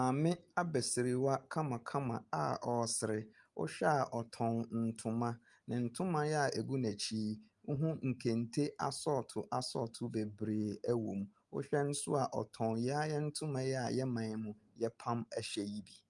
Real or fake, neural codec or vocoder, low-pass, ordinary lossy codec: real; none; 10.8 kHz; Opus, 32 kbps